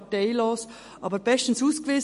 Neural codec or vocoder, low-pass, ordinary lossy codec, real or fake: none; 14.4 kHz; MP3, 48 kbps; real